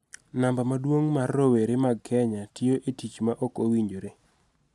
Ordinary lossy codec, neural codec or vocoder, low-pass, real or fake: none; none; none; real